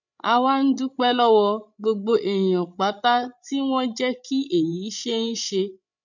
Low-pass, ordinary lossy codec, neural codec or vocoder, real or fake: 7.2 kHz; none; codec, 16 kHz, 16 kbps, FreqCodec, larger model; fake